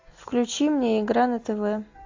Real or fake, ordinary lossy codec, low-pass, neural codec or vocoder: real; AAC, 48 kbps; 7.2 kHz; none